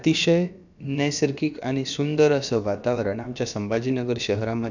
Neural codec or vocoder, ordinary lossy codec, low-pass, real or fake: codec, 16 kHz, about 1 kbps, DyCAST, with the encoder's durations; none; 7.2 kHz; fake